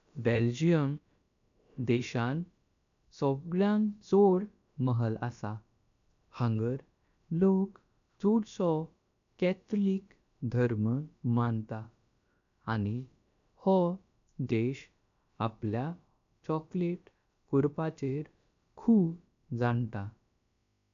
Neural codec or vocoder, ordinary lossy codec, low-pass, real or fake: codec, 16 kHz, about 1 kbps, DyCAST, with the encoder's durations; none; 7.2 kHz; fake